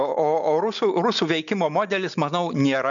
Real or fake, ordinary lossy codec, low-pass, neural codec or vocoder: real; MP3, 96 kbps; 7.2 kHz; none